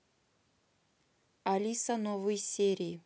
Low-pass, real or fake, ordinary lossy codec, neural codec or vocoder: none; real; none; none